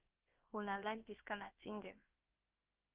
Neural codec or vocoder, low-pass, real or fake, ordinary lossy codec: codec, 16 kHz, about 1 kbps, DyCAST, with the encoder's durations; 3.6 kHz; fake; Opus, 32 kbps